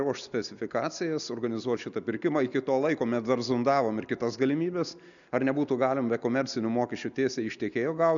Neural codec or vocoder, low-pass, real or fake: none; 7.2 kHz; real